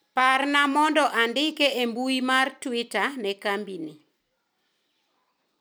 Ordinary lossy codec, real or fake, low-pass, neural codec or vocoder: none; real; none; none